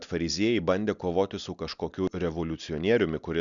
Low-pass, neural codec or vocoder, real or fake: 7.2 kHz; none; real